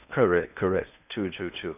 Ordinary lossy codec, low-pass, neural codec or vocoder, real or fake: none; 3.6 kHz; codec, 16 kHz in and 24 kHz out, 0.8 kbps, FocalCodec, streaming, 65536 codes; fake